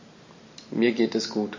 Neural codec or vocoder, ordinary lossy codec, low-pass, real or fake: none; MP3, 32 kbps; 7.2 kHz; real